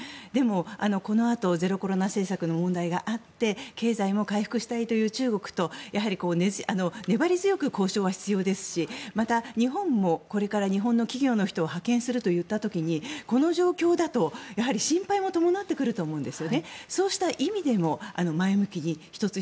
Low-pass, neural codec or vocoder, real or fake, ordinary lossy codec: none; none; real; none